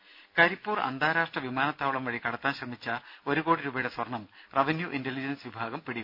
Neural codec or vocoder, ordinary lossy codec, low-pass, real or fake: none; none; 5.4 kHz; real